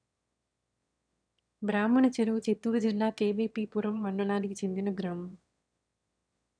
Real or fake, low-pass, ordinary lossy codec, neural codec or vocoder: fake; 9.9 kHz; none; autoencoder, 22.05 kHz, a latent of 192 numbers a frame, VITS, trained on one speaker